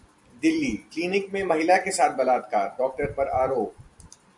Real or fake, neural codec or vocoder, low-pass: fake; vocoder, 44.1 kHz, 128 mel bands every 256 samples, BigVGAN v2; 10.8 kHz